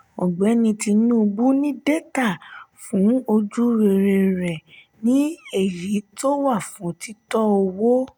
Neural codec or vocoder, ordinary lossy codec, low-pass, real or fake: none; none; none; real